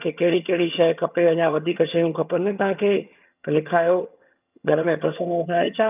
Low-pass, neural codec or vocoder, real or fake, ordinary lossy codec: 3.6 kHz; vocoder, 22.05 kHz, 80 mel bands, HiFi-GAN; fake; none